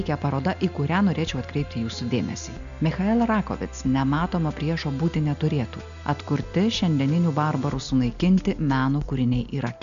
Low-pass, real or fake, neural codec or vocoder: 7.2 kHz; real; none